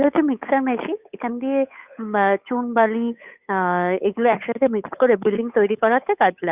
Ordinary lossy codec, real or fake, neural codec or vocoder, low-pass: Opus, 64 kbps; fake; codec, 16 kHz, 8 kbps, FunCodec, trained on Chinese and English, 25 frames a second; 3.6 kHz